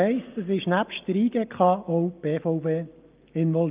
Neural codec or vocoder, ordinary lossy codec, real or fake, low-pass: none; Opus, 24 kbps; real; 3.6 kHz